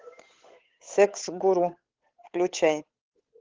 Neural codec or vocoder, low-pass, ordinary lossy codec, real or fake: codec, 16 kHz, 8 kbps, FunCodec, trained on Chinese and English, 25 frames a second; 7.2 kHz; Opus, 16 kbps; fake